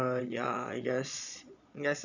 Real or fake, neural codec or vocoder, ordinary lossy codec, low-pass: fake; vocoder, 22.05 kHz, 80 mel bands, Vocos; none; 7.2 kHz